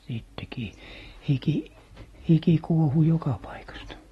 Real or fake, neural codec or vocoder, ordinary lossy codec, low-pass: real; none; AAC, 32 kbps; 19.8 kHz